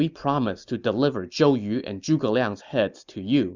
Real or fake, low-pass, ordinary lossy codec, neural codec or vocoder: real; 7.2 kHz; Opus, 64 kbps; none